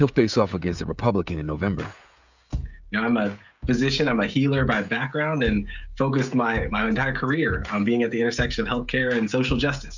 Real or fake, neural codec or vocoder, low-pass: fake; codec, 16 kHz, 16 kbps, FreqCodec, smaller model; 7.2 kHz